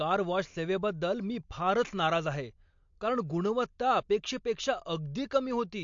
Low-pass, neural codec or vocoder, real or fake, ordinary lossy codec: 7.2 kHz; none; real; MP3, 48 kbps